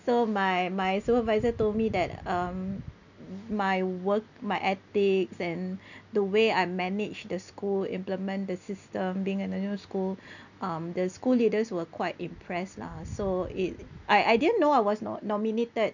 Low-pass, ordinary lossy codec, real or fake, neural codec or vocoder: 7.2 kHz; none; real; none